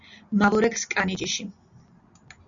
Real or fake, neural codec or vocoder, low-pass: real; none; 7.2 kHz